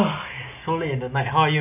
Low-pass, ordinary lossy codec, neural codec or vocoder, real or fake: 3.6 kHz; none; none; real